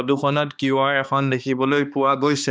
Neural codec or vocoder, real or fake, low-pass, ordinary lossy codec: codec, 16 kHz, 2 kbps, X-Codec, HuBERT features, trained on balanced general audio; fake; none; none